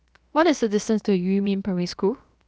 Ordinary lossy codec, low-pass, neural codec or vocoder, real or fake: none; none; codec, 16 kHz, 0.7 kbps, FocalCodec; fake